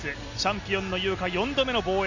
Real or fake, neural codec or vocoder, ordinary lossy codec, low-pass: real; none; none; 7.2 kHz